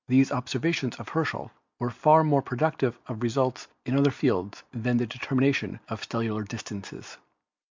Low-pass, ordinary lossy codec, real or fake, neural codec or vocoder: 7.2 kHz; MP3, 64 kbps; real; none